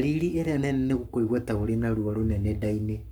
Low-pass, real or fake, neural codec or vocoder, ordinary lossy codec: none; fake; codec, 44.1 kHz, 7.8 kbps, Pupu-Codec; none